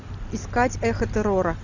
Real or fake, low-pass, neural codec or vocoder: real; 7.2 kHz; none